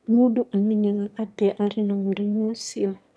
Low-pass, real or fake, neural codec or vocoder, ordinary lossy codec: none; fake; autoencoder, 22.05 kHz, a latent of 192 numbers a frame, VITS, trained on one speaker; none